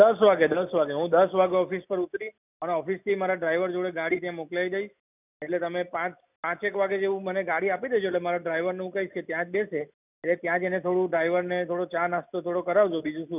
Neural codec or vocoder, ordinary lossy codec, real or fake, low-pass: none; none; real; 3.6 kHz